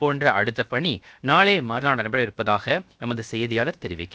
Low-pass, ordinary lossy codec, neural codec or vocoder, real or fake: none; none; codec, 16 kHz, 0.7 kbps, FocalCodec; fake